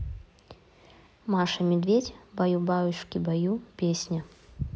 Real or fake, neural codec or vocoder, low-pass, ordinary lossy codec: real; none; none; none